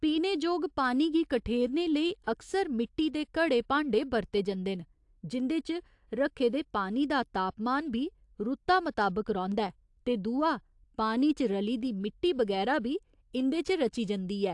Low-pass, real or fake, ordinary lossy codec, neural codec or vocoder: 10.8 kHz; real; AAC, 64 kbps; none